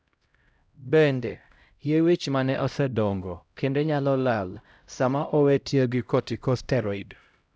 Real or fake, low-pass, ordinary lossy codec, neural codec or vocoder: fake; none; none; codec, 16 kHz, 0.5 kbps, X-Codec, HuBERT features, trained on LibriSpeech